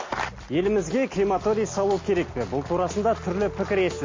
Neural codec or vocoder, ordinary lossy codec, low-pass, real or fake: none; MP3, 32 kbps; 7.2 kHz; real